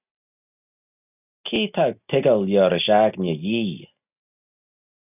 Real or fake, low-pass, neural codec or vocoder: real; 3.6 kHz; none